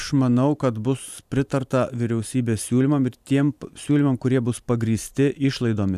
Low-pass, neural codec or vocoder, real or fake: 14.4 kHz; vocoder, 44.1 kHz, 128 mel bands every 512 samples, BigVGAN v2; fake